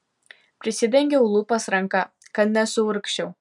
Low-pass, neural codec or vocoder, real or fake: 10.8 kHz; none; real